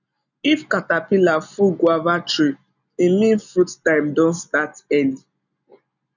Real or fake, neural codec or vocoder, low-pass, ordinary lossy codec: real; none; 7.2 kHz; none